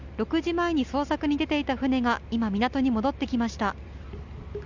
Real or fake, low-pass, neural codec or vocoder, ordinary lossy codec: real; 7.2 kHz; none; Opus, 64 kbps